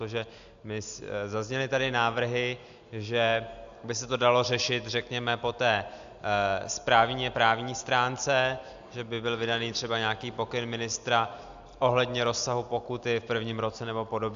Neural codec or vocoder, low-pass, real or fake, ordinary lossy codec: none; 7.2 kHz; real; AAC, 96 kbps